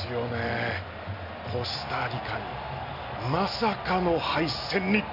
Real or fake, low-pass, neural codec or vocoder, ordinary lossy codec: real; 5.4 kHz; none; none